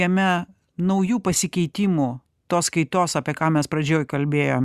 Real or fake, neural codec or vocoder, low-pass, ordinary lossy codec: real; none; 14.4 kHz; Opus, 64 kbps